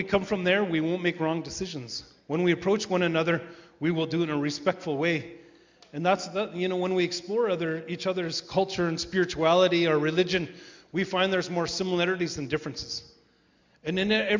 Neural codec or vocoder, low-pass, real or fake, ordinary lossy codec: none; 7.2 kHz; real; MP3, 64 kbps